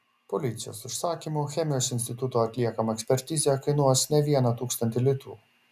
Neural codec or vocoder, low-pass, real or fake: none; 14.4 kHz; real